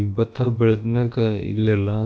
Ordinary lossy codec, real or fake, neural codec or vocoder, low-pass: none; fake; codec, 16 kHz, about 1 kbps, DyCAST, with the encoder's durations; none